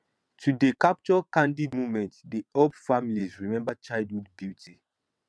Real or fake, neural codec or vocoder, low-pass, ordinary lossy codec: fake; vocoder, 22.05 kHz, 80 mel bands, WaveNeXt; none; none